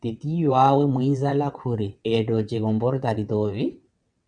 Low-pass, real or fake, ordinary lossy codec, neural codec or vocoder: 9.9 kHz; fake; MP3, 96 kbps; vocoder, 22.05 kHz, 80 mel bands, WaveNeXt